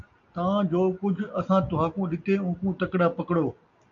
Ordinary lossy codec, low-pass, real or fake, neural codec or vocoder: AAC, 64 kbps; 7.2 kHz; real; none